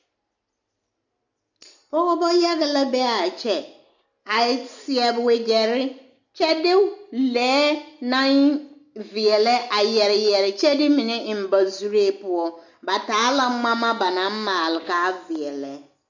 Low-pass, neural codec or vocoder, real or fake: 7.2 kHz; none; real